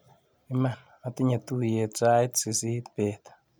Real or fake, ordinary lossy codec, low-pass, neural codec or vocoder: real; none; none; none